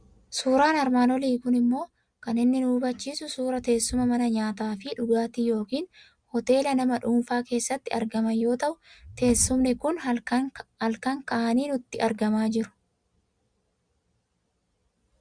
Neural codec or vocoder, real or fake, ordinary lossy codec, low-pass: none; real; Opus, 64 kbps; 9.9 kHz